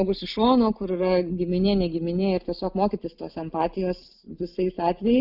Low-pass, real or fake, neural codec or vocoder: 5.4 kHz; real; none